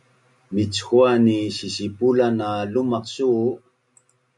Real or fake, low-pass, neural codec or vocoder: real; 10.8 kHz; none